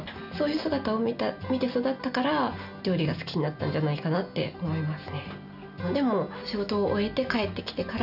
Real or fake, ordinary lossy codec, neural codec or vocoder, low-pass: real; none; none; 5.4 kHz